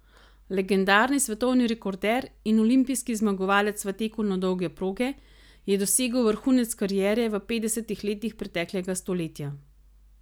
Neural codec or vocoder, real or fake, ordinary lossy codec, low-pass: none; real; none; none